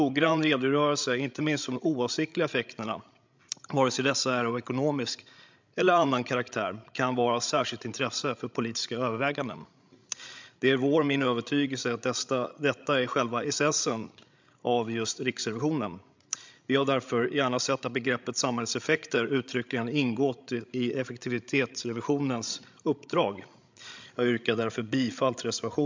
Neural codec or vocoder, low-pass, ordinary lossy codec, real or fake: codec, 16 kHz, 16 kbps, FreqCodec, larger model; 7.2 kHz; MP3, 64 kbps; fake